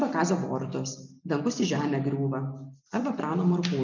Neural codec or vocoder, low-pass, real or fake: none; 7.2 kHz; real